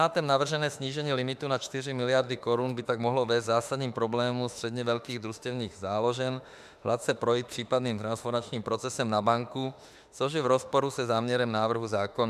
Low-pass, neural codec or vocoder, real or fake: 14.4 kHz; autoencoder, 48 kHz, 32 numbers a frame, DAC-VAE, trained on Japanese speech; fake